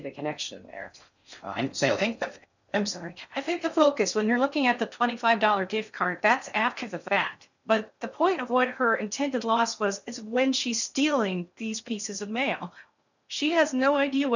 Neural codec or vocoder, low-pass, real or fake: codec, 16 kHz in and 24 kHz out, 0.6 kbps, FocalCodec, streaming, 4096 codes; 7.2 kHz; fake